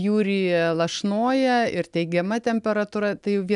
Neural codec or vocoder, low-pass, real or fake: none; 10.8 kHz; real